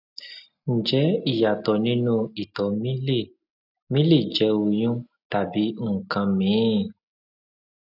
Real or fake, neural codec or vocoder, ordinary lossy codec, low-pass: real; none; none; 5.4 kHz